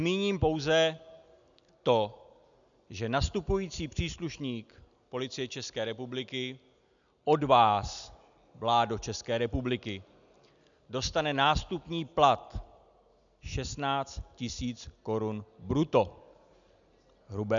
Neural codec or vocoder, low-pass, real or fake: none; 7.2 kHz; real